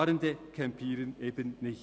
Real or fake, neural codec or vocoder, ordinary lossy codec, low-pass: real; none; none; none